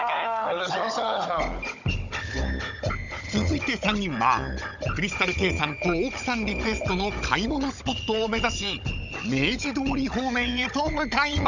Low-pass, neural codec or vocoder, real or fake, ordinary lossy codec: 7.2 kHz; codec, 16 kHz, 16 kbps, FunCodec, trained on Chinese and English, 50 frames a second; fake; none